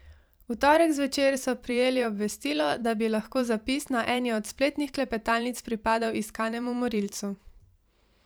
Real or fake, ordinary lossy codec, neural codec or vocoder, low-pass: fake; none; vocoder, 44.1 kHz, 128 mel bands every 512 samples, BigVGAN v2; none